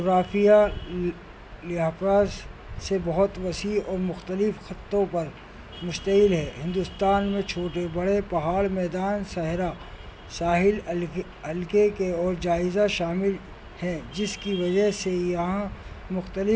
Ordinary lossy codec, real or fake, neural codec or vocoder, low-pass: none; real; none; none